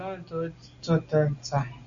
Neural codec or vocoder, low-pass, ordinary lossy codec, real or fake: none; 7.2 kHz; Opus, 64 kbps; real